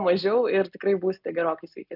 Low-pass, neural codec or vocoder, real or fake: 5.4 kHz; none; real